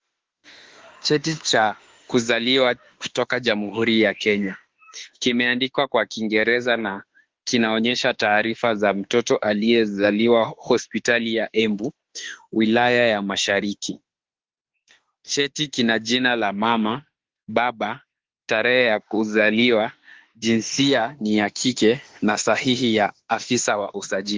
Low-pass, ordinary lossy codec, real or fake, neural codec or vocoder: 7.2 kHz; Opus, 16 kbps; fake; autoencoder, 48 kHz, 32 numbers a frame, DAC-VAE, trained on Japanese speech